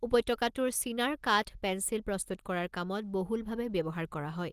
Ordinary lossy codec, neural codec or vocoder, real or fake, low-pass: Opus, 24 kbps; none; real; 14.4 kHz